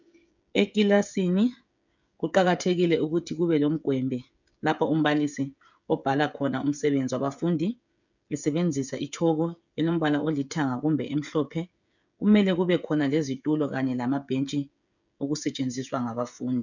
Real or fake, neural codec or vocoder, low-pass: fake; codec, 16 kHz, 16 kbps, FreqCodec, smaller model; 7.2 kHz